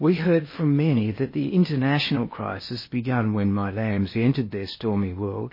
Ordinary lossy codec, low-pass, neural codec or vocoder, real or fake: MP3, 24 kbps; 5.4 kHz; codec, 16 kHz in and 24 kHz out, 0.8 kbps, FocalCodec, streaming, 65536 codes; fake